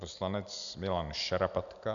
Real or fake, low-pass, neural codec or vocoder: real; 7.2 kHz; none